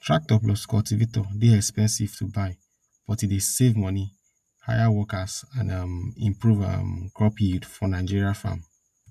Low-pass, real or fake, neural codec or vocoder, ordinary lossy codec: 14.4 kHz; real; none; AAC, 96 kbps